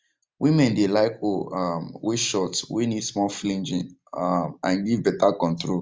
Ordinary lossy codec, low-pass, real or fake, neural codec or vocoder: none; none; real; none